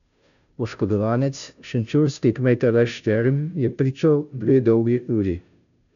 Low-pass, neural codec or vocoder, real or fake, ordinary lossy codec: 7.2 kHz; codec, 16 kHz, 0.5 kbps, FunCodec, trained on Chinese and English, 25 frames a second; fake; none